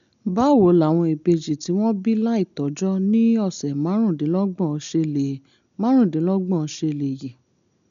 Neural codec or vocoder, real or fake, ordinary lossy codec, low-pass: none; real; none; 7.2 kHz